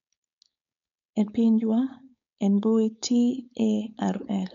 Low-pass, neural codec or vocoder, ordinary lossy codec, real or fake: 7.2 kHz; codec, 16 kHz, 4.8 kbps, FACodec; none; fake